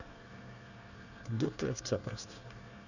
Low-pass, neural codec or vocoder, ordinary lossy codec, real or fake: 7.2 kHz; codec, 24 kHz, 1 kbps, SNAC; none; fake